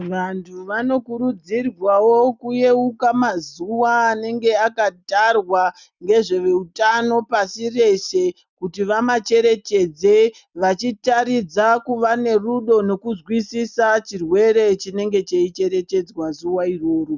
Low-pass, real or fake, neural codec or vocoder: 7.2 kHz; real; none